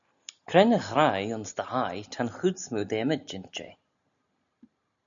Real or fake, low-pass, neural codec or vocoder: real; 7.2 kHz; none